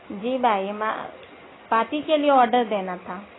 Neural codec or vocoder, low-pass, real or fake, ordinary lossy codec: none; 7.2 kHz; real; AAC, 16 kbps